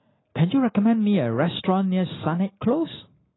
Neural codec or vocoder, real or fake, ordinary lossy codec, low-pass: none; real; AAC, 16 kbps; 7.2 kHz